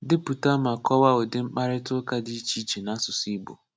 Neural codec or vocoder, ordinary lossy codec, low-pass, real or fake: none; none; none; real